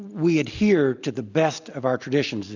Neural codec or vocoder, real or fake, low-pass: none; real; 7.2 kHz